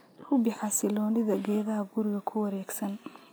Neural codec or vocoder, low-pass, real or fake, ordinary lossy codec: none; none; real; none